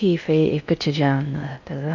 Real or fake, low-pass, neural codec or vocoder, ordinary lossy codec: fake; 7.2 kHz; codec, 16 kHz in and 24 kHz out, 0.6 kbps, FocalCodec, streaming, 4096 codes; none